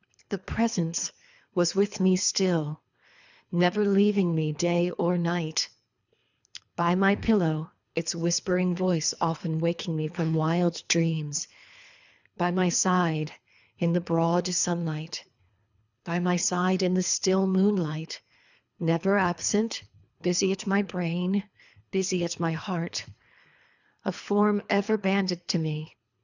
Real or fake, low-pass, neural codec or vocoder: fake; 7.2 kHz; codec, 24 kHz, 3 kbps, HILCodec